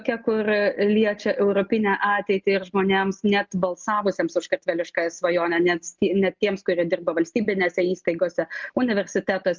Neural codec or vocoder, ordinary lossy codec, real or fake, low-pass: none; Opus, 32 kbps; real; 7.2 kHz